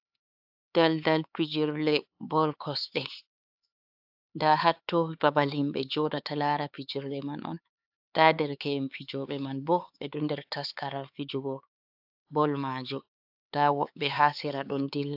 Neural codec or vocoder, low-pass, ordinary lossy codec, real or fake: codec, 16 kHz, 4 kbps, X-Codec, HuBERT features, trained on LibriSpeech; 5.4 kHz; AAC, 48 kbps; fake